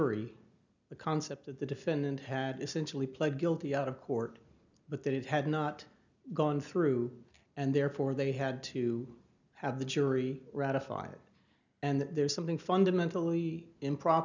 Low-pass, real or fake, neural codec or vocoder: 7.2 kHz; real; none